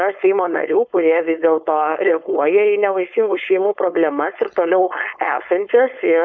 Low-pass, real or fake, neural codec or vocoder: 7.2 kHz; fake; codec, 16 kHz, 4.8 kbps, FACodec